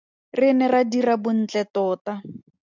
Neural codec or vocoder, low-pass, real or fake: none; 7.2 kHz; real